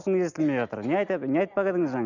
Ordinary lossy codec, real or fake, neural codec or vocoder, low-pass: none; real; none; 7.2 kHz